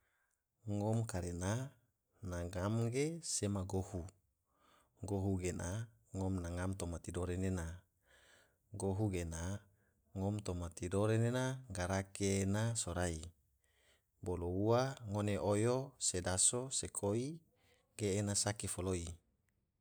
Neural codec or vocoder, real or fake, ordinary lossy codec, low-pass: none; real; none; none